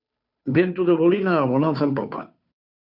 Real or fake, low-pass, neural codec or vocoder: fake; 5.4 kHz; codec, 16 kHz, 2 kbps, FunCodec, trained on Chinese and English, 25 frames a second